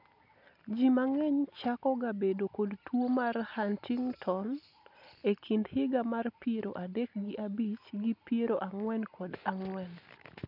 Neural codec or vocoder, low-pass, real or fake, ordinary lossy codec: none; 5.4 kHz; real; none